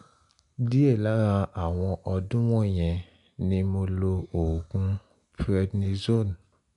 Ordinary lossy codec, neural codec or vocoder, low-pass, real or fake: none; none; 10.8 kHz; real